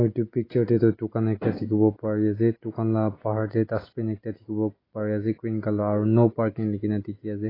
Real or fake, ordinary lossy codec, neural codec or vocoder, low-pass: real; AAC, 24 kbps; none; 5.4 kHz